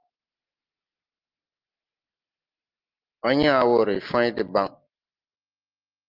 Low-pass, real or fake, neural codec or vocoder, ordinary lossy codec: 5.4 kHz; real; none; Opus, 24 kbps